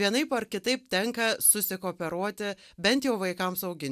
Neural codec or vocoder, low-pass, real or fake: none; 14.4 kHz; real